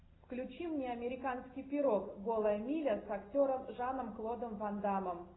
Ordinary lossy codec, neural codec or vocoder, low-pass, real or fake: AAC, 16 kbps; none; 7.2 kHz; real